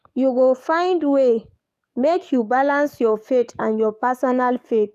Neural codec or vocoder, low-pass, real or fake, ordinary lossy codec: codec, 44.1 kHz, 7.8 kbps, DAC; 14.4 kHz; fake; none